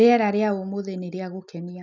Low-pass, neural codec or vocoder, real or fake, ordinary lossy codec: 7.2 kHz; none; real; none